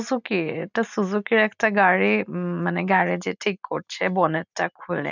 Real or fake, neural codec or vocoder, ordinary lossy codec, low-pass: real; none; none; 7.2 kHz